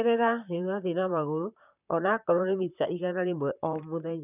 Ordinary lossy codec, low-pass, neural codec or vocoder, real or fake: none; 3.6 kHz; vocoder, 44.1 kHz, 128 mel bands, Pupu-Vocoder; fake